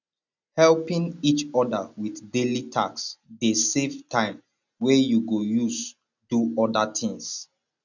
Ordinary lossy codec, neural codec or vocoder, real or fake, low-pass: none; none; real; 7.2 kHz